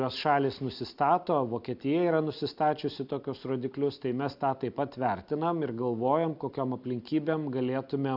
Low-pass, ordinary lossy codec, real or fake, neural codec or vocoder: 5.4 kHz; AAC, 48 kbps; real; none